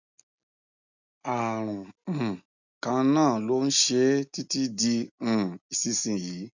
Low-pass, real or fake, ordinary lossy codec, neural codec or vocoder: 7.2 kHz; real; none; none